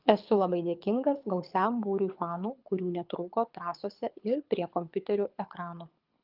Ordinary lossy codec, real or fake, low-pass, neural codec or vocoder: Opus, 32 kbps; fake; 5.4 kHz; codec, 24 kHz, 6 kbps, HILCodec